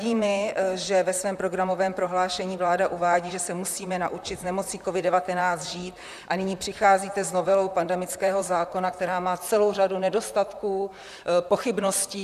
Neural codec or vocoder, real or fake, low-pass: vocoder, 44.1 kHz, 128 mel bands, Pupu-Vocoder; fake; 14.4 kHz